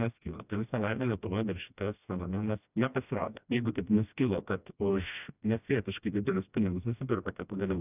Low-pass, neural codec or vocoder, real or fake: 3.6 kHz; codec, 16 kHz, 1 kbps, FreqCodec, smaller model; fake